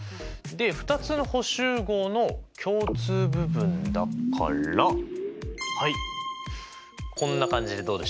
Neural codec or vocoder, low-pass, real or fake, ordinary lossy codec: none; none; real; none